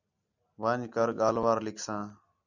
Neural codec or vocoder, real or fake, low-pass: none; real; 7.2 kHz